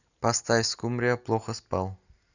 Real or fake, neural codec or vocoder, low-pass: real; none; 7.2 kHz